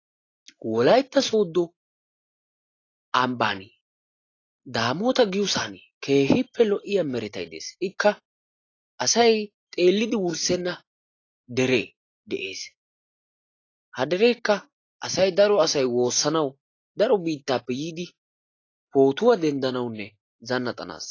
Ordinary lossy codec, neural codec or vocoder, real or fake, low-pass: AAC, 32 kbps; none; real; 7.2 kHz